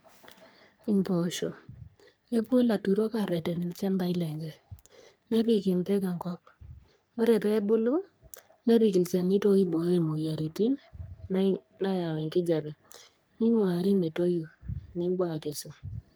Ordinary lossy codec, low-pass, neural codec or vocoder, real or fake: none; none; codec, 44.1 kHz, 3.4 kbps, Pupu-Codec; fake